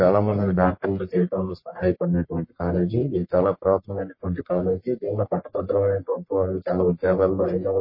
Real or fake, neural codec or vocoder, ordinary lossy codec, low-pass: fake; codec, 44.1 kHz, 1.7 kbps, Pupu-Codec; MP3, 24 kbps; 5.4 kHz